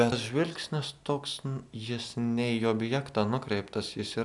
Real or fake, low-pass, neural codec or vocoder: real; 10.8 kHz; none